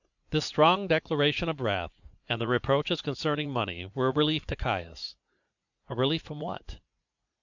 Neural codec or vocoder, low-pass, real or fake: vocoder, 44.1 kHz, 80 mel bands, Vocos; 7.2 kHz; fake